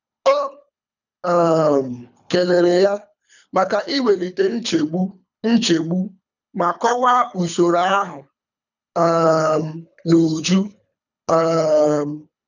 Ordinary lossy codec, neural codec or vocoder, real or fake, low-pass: none; codec, 24 kHz, 3 kbps, HILCodec; fake; 7.2 kHz